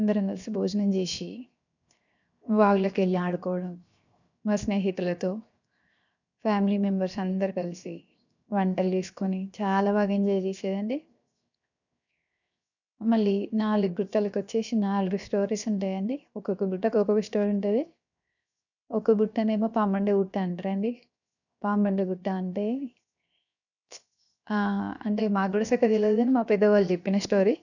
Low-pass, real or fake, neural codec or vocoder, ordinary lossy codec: 7.2 kHz; fake; codec, 16 kHz, 0.7 kbps, FocalCodec; none